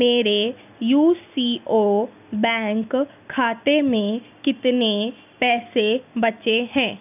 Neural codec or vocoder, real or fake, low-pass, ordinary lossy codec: none; real; 3.6 kHz; none